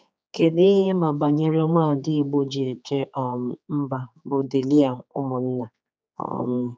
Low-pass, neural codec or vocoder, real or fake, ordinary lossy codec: none; codec, 16 kHz, 4 kbps, X-Codec, HuBERT features, trained on general audio; fake; none